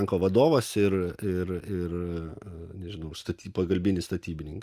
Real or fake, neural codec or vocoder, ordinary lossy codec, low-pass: real; none; Opus, 32 kbps; 14.4 kHz